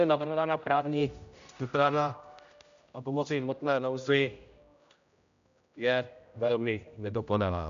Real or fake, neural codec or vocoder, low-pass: fake; codec, 16 kHz, 0.5 kbps, X-Codec, HuBERT features, trained on general audio; 7.2 kHz